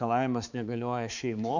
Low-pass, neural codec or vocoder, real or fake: 7.2 kHz; codec, 16 kHz, 4 kbps, X-Codec, HuBERT features, trained on balanced general audio; fake